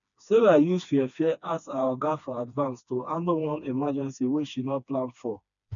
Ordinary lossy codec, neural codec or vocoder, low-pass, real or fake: none; codec, 16 kHz, 2 kbps, FreqCodec, smaller model; 7.2 kHz; fake